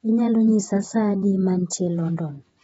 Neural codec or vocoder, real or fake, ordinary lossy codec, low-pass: vocoder, 48 kHz, 128 mel bands, Vocos; fake; AAC, 24 kbps; 19.8 kHz